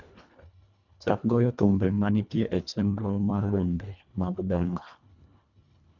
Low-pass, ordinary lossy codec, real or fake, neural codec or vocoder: 7.2 kHz; none; fake; codec, 24 kHz, 1.5 kbps, HILCodec